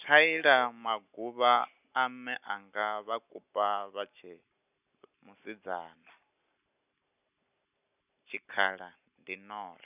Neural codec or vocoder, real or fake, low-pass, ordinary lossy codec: none; real; 3.6 kHz; none